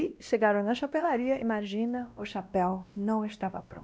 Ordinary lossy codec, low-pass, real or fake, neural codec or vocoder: none; none; fake; codec, 16 kHz, 1 kbps, X-Codec, WavLM features, trained on Multilingual LibriSpeech